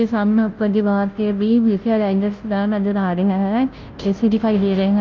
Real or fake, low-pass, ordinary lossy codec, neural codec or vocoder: fake; 7.2 kHz; Opus, 32 kbps; codec, 16 kHz, 0.5 kbps, FunCodec, trained on Chinese and English, 25 frames a second